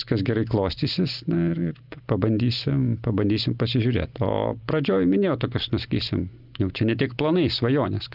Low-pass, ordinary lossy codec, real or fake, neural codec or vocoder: 5.4 kHz; Opus, 32 kbps; real; none